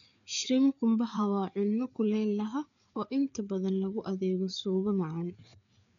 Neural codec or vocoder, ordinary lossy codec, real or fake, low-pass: codec, 16 kHz, 4 kbps, FreqCodec, larger model; none; fake; 7.2 kHz